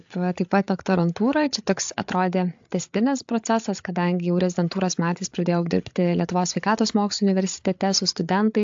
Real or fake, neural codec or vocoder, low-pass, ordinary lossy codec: fake; codec, 16 kHz, 8 kbps, FreqCodec, larger model; 7.2 kHz; AAC, 64 kbps